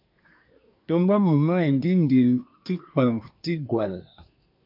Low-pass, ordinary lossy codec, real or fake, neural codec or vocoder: 5.4 kHz; MP3, 48 kbps; fake; codec, 24 kHz, 1 kbps, SNAC